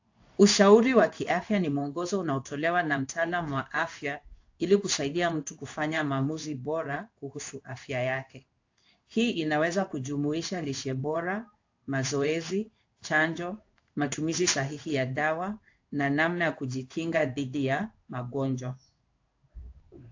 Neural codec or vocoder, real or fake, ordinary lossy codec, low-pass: codec, 16 kHz in and 24 kHz out, 1 kbps, XY-Tokenizer; fake; AAC, 48 kbps; 7.2 kHz